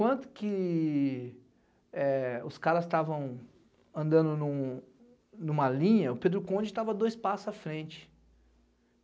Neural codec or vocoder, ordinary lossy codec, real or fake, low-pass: none; none; real; none